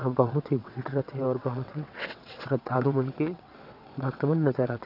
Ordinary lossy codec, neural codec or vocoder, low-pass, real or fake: none; vocoder, 22.05 kHz, 80 mel bands, WaveNeXt; 5.4 kHz; fake